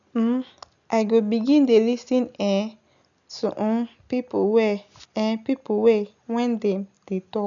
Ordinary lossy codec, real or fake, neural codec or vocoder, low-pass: none; real; none; 7.2 kHz